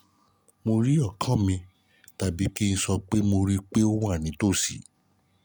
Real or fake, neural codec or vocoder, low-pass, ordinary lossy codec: real; none; none; none